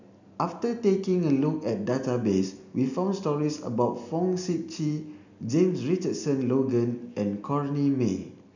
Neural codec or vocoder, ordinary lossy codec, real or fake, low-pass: none; none; real; 7.2 kHz